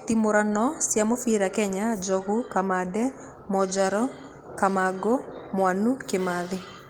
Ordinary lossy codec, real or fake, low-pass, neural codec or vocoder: Opus, 24 kbps; real; 19.8 kHz; none